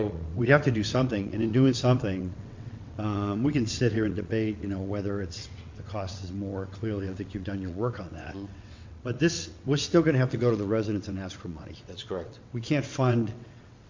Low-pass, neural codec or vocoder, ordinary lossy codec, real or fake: 7.2 kHz; vocoder, 22.05 kHz, 80 mel bands, WaveNeXt; MP3, 48 kbps; fake